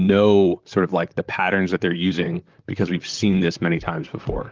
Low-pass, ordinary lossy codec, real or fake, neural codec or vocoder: 7.2 kHz; Opus, 32 kbps; fake; vocoder, 44.1 kHz, 128 mel bands, Pupu-Vocoder